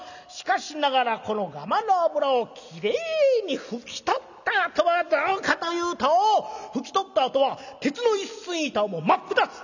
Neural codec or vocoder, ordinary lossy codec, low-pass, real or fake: none; none; 7.2 kHz; real